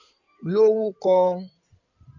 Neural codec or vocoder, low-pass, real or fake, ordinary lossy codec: codec, 16 kHz in and 24 kHz out, 2.2 kbps, FireRedTTS-2 codec; 7.2 kHz; fake; none